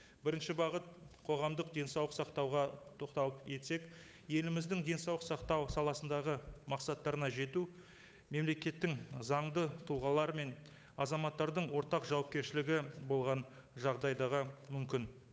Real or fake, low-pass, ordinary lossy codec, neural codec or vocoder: fake; none; none; codec, 16 kHz, 8 kbps, FunCodec, trained on Chinese and English, 25 frames a second